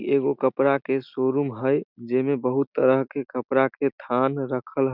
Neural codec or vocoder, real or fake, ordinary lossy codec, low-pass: none; real; none; 5.4 kHz